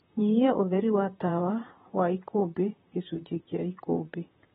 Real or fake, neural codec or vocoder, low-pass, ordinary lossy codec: fake; vocoder, 44.1 kHz, 128 mel bands, Pupu-Vocoder; 19.8 kHz; AAC, 16 kbps